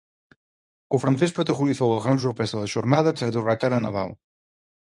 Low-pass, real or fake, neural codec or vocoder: 10.8 kHz; fake; codec, 24 kHz, 0.9 kbps, WavTokenizer, medium speech release version 1